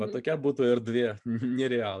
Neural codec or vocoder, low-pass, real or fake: vocoder, 44.1 kHz, 128 mel bands every 512 samples, BigVGAN v2; 10.8 kHz; fake